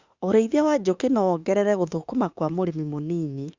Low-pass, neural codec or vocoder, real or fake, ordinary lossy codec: 7.2 kHz; autoencoder, 48 kHz, 32 numbers a frame, DAC-VAE, trained on Japanese speech; fake; Opus, 64 kbps